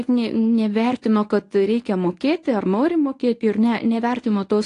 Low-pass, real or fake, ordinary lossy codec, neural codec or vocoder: 10.8 kHz; fake; AAC, 48 kbps; codec, 24 kHz, 0.9 kbps, WavTokenizer, medium speech release version 1